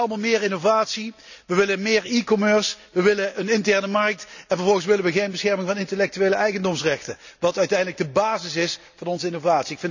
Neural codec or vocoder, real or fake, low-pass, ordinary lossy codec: none; real; 7.2 kHz; none